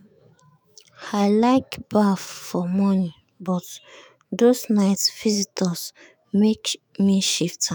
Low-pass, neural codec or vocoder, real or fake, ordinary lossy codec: none; autoencoder, 48 kHz, 128 numbers a frame, DAC-VAE, trained on Japanese speech; fake; none